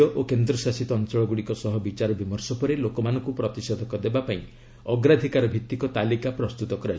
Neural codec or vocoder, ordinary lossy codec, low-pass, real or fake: none; none; none; real